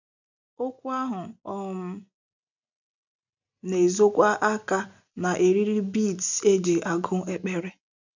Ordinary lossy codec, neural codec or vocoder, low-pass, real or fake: none; none; 7.2 kHz; real